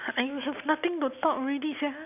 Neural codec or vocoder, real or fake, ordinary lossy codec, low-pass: none; real; none; 3.6 kHz